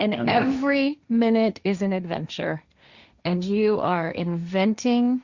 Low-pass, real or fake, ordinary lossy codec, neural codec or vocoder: 7.2 kHz; fake; Opus, 64 kbps; codec, 16 kHz, 1.1 kbps, Voila-Tokenizer